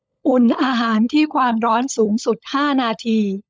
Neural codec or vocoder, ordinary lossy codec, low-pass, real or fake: codec, 16 kHz, 16 kbps, FunCodec, trained on LibriTTS, 50 frames a second; none; none; fake